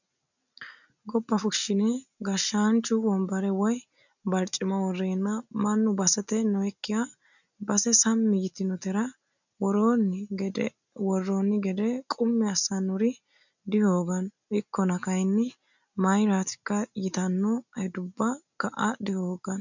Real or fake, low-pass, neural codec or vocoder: real; 7.2 kHz; none